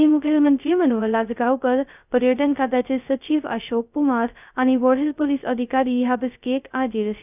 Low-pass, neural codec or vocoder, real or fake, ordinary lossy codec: 3.6 kHz; codec, 16 kHz, 0.2 kbps, FocalCodec; fake; none